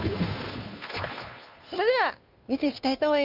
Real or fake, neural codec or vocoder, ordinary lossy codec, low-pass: fake; codec, 16 kHz, 2 kbps, FunCodec, trained on Chinese and English, 25 frames a second; Opus, 64 kbps; 5.4 kHz